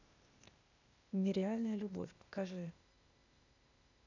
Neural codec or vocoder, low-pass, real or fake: codec, 16 kHz, 0.8 kbps, ZipCodec; 7.2 kHz; fake